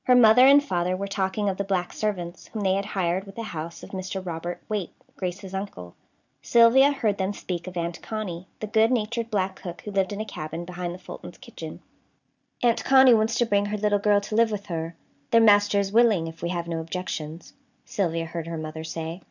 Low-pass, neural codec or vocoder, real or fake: 7.2 kHz; none; real